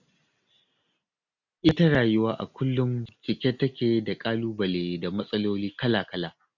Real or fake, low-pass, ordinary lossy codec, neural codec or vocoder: real; 7.2 kHz; none; none